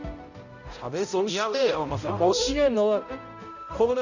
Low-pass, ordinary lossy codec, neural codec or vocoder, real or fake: 7.2 kHz; none; codec, 16 kHz, 0.5 kbps, X-Codec, HuBERT features, trained on balanced general audio; fake